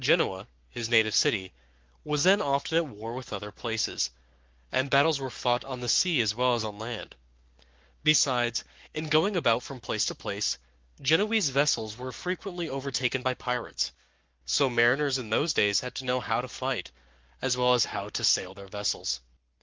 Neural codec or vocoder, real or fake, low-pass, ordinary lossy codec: codec, 44.1 kHz, 7.8 kbps, Pupu-Codec; fake; 7.2 kHz; Opus, 32 kbps